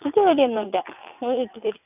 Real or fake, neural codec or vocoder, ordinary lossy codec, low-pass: fake; vocoder, 22.05 kHz, 80 mel bands, WaveNeXt; none; 3.6 kHz